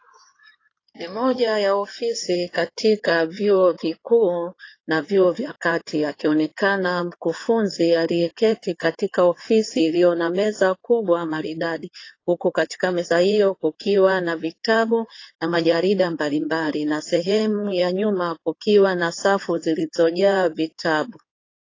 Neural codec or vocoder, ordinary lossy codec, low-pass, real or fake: codec, 16 kHz in and 24 kHz out, 2.2 kbps, FireRedTTS-2 codec; AAC, 32 kbps; 7.2 kHz; fake